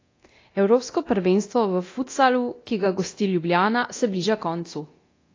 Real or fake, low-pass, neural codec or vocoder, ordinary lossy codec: fake; 7.2 kHz; codec, 24 kHz, 0.9 kbps, DualCodec; AAC, 32 kbps